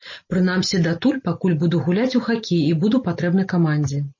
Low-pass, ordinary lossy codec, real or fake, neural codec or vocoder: 7.2 kHz; MP3, 32 kbps; real; none